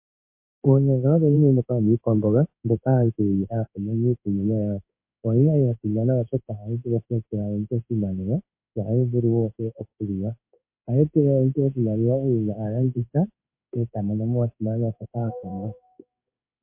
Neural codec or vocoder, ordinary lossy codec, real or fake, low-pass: codec, 16 kHz in and 24 kHz out, 1 kbps, XY-Tokenizer; MP3, 24 kbps; fake; 3.6 kHz